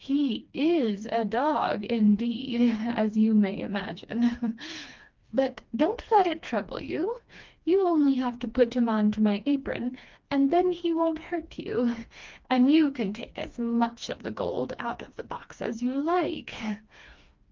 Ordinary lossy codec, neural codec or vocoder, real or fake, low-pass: Opus, 24 kbps; codec, 16 kHz, 2 kbps, FreqCodec, smaller model; fake; 7.2 kHz